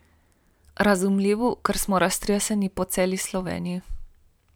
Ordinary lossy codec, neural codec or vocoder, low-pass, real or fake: none; vocoder, 44.1 kHz, 128 mel bands every 512 samples, BigVGAN v2; none; fake